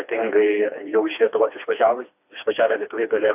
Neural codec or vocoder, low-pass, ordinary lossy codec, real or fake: codec, 16 kHz, 2 kbps, FreqCodec, smaller model; 3.6 kHz; AAC, 32 kbps; fake